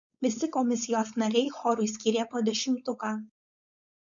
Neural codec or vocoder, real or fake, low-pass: codec, 16 kHz, 4.8 kbps, FACodec; fake; 7.2 kHz